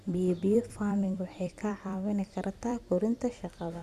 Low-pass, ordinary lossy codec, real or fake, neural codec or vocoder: 14.4 kHz; none; fake; vocoder, 44.1 kHz, 128 mel bands every 256 samples, BigVGAN v2